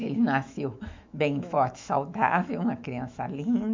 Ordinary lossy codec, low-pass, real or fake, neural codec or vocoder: MP3, 64 kbps; 7.2 kHz; fake; autoencoder, 48 kHz, 128 numbers a frame, DAC-VAE, trained on Japanese speech